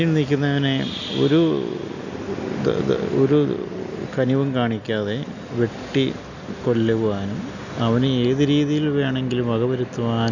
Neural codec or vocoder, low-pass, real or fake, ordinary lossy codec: none; 7.2 kHz; real; none